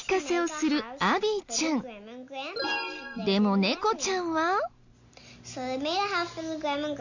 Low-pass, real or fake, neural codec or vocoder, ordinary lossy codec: 7.2 kHz; real; none; none